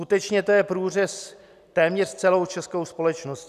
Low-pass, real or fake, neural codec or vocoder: 14.4 kHz; real; none